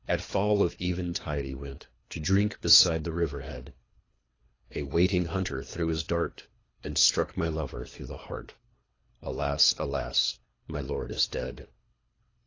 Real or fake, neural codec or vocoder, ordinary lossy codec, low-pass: fake; codec, 24 kHz, 3 kbps, HILCodec; AAC, 32 kbps; 7.2 kHz